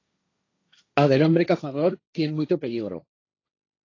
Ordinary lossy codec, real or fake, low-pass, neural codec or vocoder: AAC, 32 kbps; fake; 7.2 kHz; codec, 16 kHz, 1.1 kbps, Voila-Tokenizer